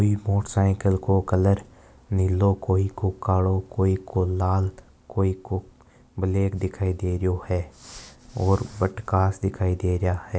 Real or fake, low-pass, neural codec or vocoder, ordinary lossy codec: real; none; none; none